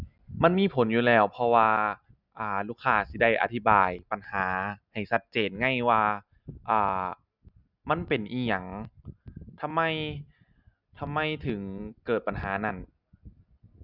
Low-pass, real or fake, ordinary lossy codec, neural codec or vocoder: 5.4 kHz; real; none; none